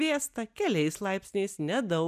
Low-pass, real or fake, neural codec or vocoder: 14.4 kHz; real; none